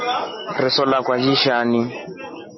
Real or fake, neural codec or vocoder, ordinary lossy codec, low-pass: real; none; MP3, 24 kbps; 7.2 kHz